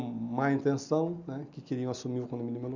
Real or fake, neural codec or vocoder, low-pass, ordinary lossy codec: real; none; 7.2 kHz; none